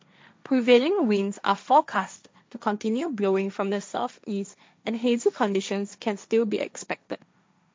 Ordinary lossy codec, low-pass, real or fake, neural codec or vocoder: none; none; fake; codec, 16 kHz, 1.1 kbps, Voila-Tokenizer